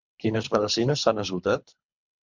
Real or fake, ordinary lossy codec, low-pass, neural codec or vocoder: fake; MP3, 64 kbps; 7.2 kHz; codec, 24 kHz, 3 kbps, HILCodec